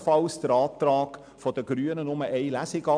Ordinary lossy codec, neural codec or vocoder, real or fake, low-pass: none; none; real; 9.9 kHz